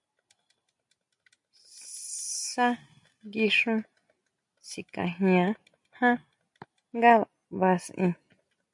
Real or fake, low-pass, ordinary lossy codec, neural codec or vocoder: real; 10.8 kHz; MP3, 64 kbps; none